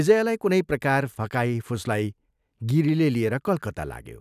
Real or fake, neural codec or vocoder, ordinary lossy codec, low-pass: real; none; none; 14.4 kHz